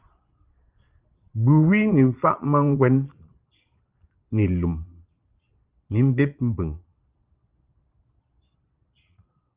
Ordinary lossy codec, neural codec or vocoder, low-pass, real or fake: Opus, 32 kbps; vocoder, 22.05 kHz, 80 mel bands, Vocos; 3.6 kHz; fake